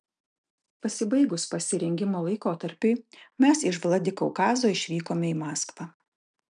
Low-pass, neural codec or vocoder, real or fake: 9.9 kHz; none; real